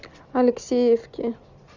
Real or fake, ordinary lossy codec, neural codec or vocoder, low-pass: real; Opus, 64 kbps; none; 7.2 kHz